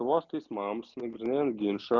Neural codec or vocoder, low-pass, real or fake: none; 7.2 kHz; real